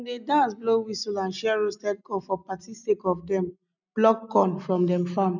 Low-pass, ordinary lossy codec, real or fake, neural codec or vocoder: 7.2 kHz; none; real; none